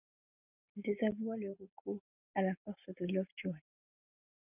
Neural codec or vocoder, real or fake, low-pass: none; real; 3.6 kHz